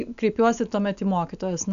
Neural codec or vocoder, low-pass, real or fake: none; 7.2 kHz; real